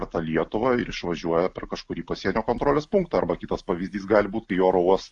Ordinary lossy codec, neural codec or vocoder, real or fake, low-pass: AAC, 64 kbps; none; real; 10.8 kHz